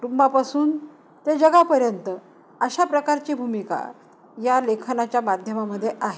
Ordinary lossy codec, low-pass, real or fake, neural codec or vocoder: none; none; real; none